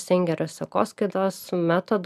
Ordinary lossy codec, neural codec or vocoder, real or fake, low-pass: AAC, 96 kbps; none; real; 14.4 kHz